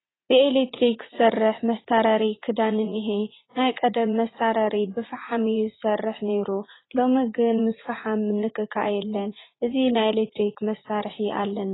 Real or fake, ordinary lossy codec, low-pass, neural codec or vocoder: fake; AAC, 16 kbps; 7.2 kHz; vocoder, 44.1 kHz, 80 mel bands, Vocos